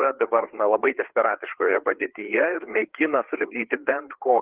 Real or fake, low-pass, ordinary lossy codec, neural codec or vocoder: fake; 3.6 kHz; Opus, 16 kbps; codec, 16 kHz, 4 kbps, FreqCodec, larger model